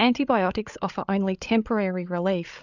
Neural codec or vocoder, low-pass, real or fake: codec, 16 kHz, 8 kbps, FreqCodec, larger model; 7.2 kHz; fake